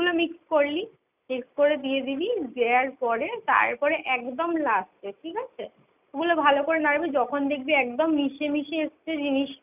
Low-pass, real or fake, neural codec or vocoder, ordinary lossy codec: 3.6 kHz; real; none; none